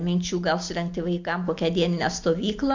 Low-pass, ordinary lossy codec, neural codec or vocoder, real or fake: 7.2 kHz; MP3, 48 kbps; none; real